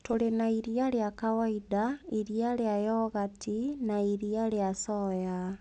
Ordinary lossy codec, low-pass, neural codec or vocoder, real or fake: none; 9.9 kHz; none; real